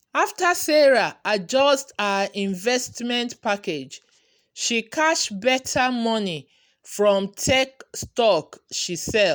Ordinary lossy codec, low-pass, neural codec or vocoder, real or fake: none; none; none; real